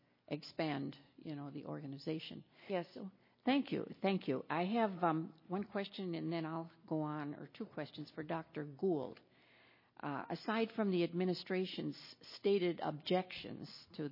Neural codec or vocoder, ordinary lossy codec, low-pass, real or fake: none; MP3, 24 kbps; 5.4 kHz; real